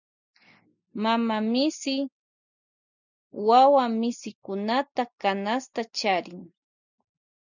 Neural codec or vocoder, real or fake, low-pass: none; real; 7.2 kHz